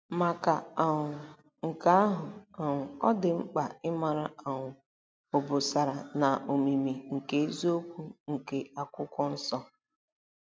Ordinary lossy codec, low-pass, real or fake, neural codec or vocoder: none; none; real; none